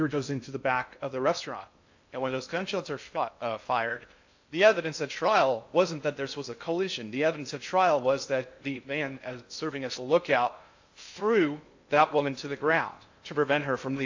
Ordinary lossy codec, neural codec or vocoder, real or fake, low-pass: AAC, 48 kbps; codec, 16 kHz in and 24 kHz out, 0.6 kbps, FocalCodec, streaming, 2048 codes; fake; 7.2 kHz